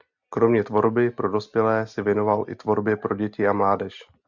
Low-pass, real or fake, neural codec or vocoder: 7.2 kHz; real; none